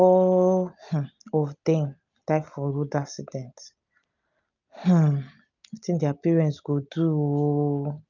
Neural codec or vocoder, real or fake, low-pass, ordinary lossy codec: none; real; 7.2 kHz; none